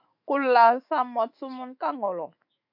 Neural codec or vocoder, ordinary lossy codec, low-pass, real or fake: autoencoder, 48 kHz, 128 numbers a frame, DAC-VAE, trained on Japanese speech; AAC, 48 kbps; 5.4 kHz; fake